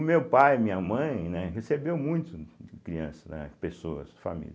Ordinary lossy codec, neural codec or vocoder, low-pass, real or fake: none; none; none; real